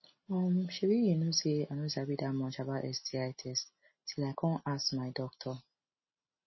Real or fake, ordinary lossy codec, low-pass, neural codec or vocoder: real; MP3, 24 kbps; 7.2 kHz; none